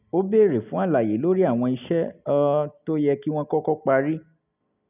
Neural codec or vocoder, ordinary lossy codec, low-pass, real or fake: none; none; 3.6 kHz; real